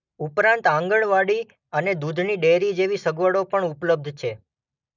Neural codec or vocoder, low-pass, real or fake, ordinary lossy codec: none; 7.2 kHz; real; none